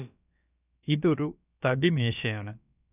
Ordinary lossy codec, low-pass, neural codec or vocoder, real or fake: none; 3.6 kHz; codec, 16 kHz, about 1 kbps, DyCAST, with the encoder's durations; fake